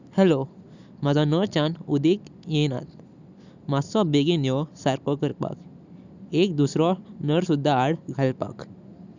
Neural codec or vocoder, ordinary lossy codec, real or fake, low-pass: none; none; real; 7.2 kHz